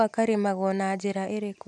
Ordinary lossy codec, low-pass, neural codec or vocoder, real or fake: none; none; none; real